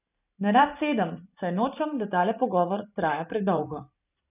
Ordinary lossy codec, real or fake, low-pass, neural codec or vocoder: none; fake; 3.6 kHz; vocoder, 44.1 kHz, 128 mel bands every 512 samples, BigVGAN v2